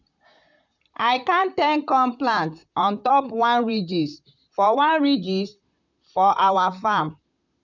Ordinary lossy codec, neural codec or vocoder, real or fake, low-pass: none; vocoder, 44.1 kHz, 128 mel bands, Pupu-Vocoder; fake; 7.2 kHz